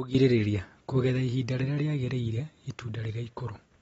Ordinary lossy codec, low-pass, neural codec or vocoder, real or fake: AAC, 24 kbps; 19.8 kHz; none; real